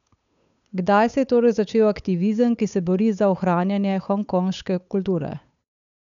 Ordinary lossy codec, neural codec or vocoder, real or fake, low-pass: none; codec, 16 kHz, 8 kbps, FunCodec, trained on Chinese and English, 25 frames a second; fake; 7.2 kHz